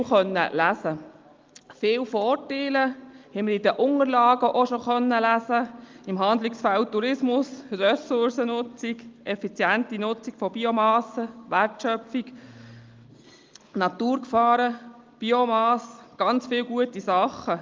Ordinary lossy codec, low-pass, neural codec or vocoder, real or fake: Opus, 32 kbps; 7.2 kHz; none; real